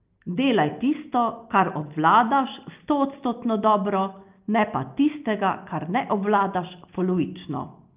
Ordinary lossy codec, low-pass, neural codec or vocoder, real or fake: Opus, 24 kbps; 3.6 kHz; none; real